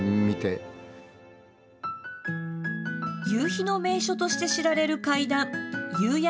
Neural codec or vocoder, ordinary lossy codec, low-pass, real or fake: none; none; none; real